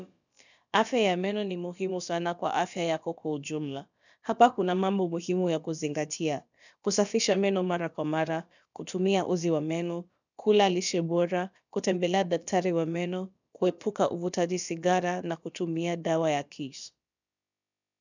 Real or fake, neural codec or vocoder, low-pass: fake; codec, 16 kHz, about 1 kbps, DyCAST, with the encoder's durations; 7.2 kHz